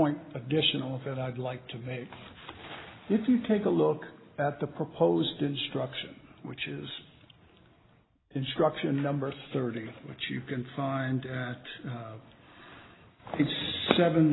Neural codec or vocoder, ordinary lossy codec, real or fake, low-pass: none; AAC, 16 kbps; real; 7.2 kHz